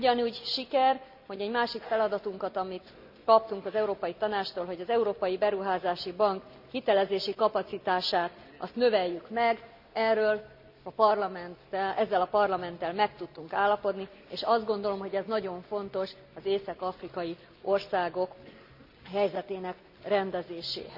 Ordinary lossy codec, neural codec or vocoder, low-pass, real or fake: none; none; 5.4 kHz; real